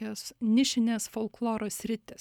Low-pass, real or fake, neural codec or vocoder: 19.8 kHz; real; none